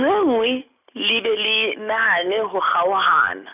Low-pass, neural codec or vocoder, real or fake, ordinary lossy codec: 3.6 kHz; none; real; none